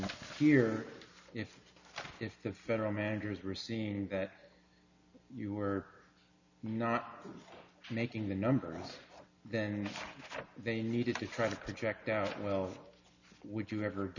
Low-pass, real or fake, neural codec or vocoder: 7.2 kHz; real; none